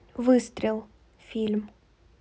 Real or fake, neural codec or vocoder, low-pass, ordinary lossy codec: real; none; none; none